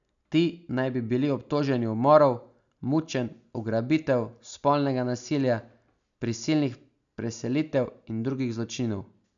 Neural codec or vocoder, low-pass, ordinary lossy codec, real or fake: none; 7.2 kHz; none; real